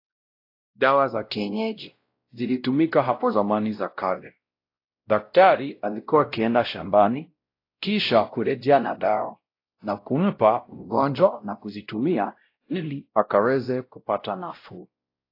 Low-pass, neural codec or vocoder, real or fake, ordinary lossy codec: 5.4 kHz; codec, 16 kHz, 0.5 kbps, X-Codec, WavLM features, trained on Multilingual LibriSpeech; fake; AAC, 32 kbps